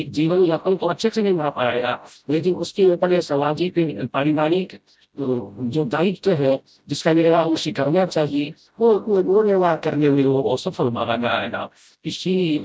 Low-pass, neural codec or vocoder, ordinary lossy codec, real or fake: none; codec, 16 kHz, 0.5 kbps, FreqCodec, smaller model; none; fake